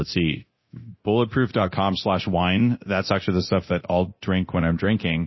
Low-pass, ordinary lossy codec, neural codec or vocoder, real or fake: 7.2 kHz; MP3, 24 kbps; codec, 24 kHz, 0.9 kbps, DualCodec; fake